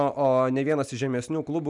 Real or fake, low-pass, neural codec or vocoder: real; 10.8 kHz; none